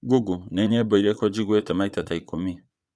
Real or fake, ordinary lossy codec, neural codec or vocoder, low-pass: fake; none; vocoder, 22.05 kHz, 80 mel bands, Vocos; none